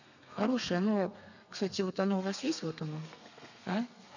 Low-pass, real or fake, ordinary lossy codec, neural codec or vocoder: 7.2 kHz; fake; none; codec, 32 kHz, 1.9 kbps, SNAC